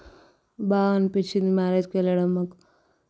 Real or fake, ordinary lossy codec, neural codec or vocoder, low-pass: real; none; none; none